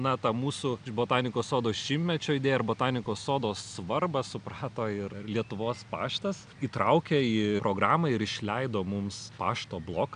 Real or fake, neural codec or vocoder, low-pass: real; none; 9.9 kHz